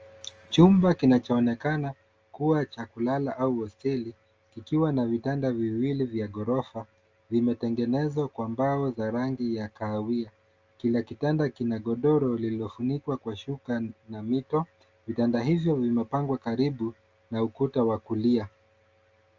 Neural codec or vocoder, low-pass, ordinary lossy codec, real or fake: none; 7.2 kHz; Opus, 24 kbps; real